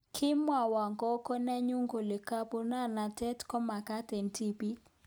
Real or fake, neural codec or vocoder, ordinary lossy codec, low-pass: real; none; none; none